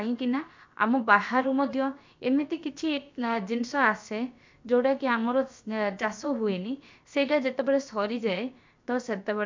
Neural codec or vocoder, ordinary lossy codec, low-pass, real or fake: codec, 16 kHz, about 1 kbps, DyCAST, with the encoder's durations; MP3, 64 kbps; 7.2 kHz; fake